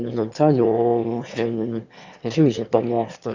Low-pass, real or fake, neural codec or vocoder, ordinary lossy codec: 7.2 kHz; fake; autoencoder, 22.05 kHz, a latent of 192 numbers a frame, VITS, trained on one speaker; Opus, 64 kbps